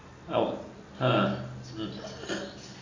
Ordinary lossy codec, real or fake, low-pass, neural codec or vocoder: AAC, 32 kbps; real; 7.2 kHz; none